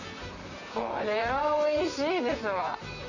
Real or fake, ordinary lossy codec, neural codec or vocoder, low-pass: fake; none; vocoder, 44.1 kHz, 128 mel bands, Pupu-Vocoder; 7.2 kHz